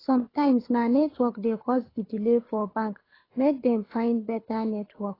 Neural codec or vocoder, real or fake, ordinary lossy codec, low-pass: codec, 24 kHz, 6 kbps, HILCodec; fake; AAC, 24 kbps; 5.4 kHz